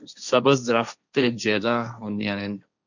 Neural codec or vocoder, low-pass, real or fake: codec, 16 kHz, 1.1 kbps, Voila-Tokenizer; 7.2 kHz; fake